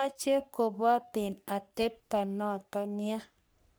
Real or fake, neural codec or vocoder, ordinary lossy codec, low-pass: fake; codec, 44.1 kHz, 3.4 kbps, Pupu-Codec; none; none